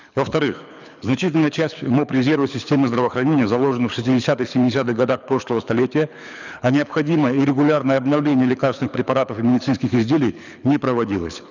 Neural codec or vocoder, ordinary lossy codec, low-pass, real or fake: codec, 24 kHz, 6 kbps, HILCodec; none; 7.2 kHz; fake